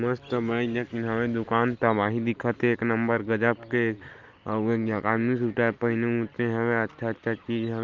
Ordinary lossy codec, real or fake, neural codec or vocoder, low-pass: Opus, 32 kbps; real; none; 7.2 kHz